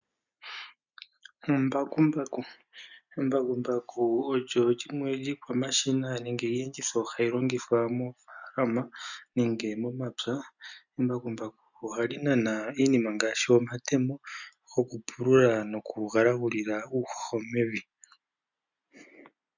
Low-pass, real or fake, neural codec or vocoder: 7.2 kHz; real; none